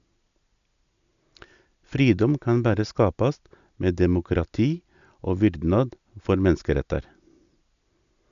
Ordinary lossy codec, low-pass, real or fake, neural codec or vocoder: none; 7.2 kHz; real; none